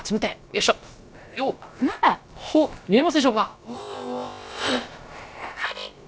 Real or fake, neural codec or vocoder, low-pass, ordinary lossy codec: fake; codec, 16 kHz, about 1 kbps, DyCAST, with the encoder's durations; none; none